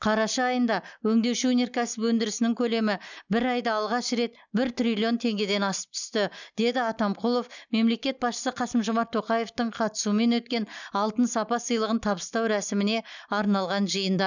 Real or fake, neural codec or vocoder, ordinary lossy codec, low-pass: real; none; none; 7.2 kHz